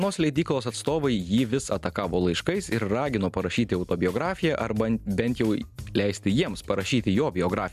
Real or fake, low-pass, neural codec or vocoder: real; 14.4 kHz; none